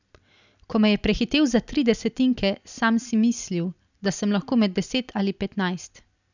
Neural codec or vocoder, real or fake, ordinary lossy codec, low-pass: none; real; none; 7.2 kHz